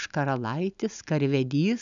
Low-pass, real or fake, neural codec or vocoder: 7.2 kHz; real; none